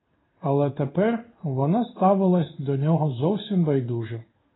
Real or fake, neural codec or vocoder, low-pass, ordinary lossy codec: fake; codec, 16 kHz in and 24 kHz out, 1 kbps, XY-Tokenizer; 7.2 kHz; AAC, 16 kbps